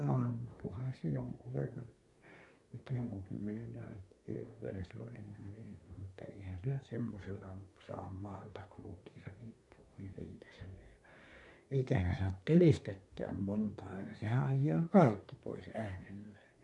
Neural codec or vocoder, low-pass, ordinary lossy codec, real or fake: codec, 24 kHz, 1 kbps, SNAC; 10.8 kHz; none; fake